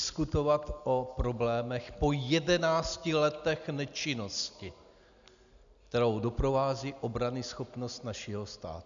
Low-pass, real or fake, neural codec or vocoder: 7.2 kHz; real; none